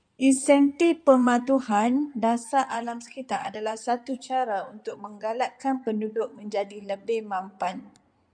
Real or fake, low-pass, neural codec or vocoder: fake; 9.9 kHz; codec, 16 kHz in and 24 kHz out, 2.2 kbps, FireRedTTS-2 codec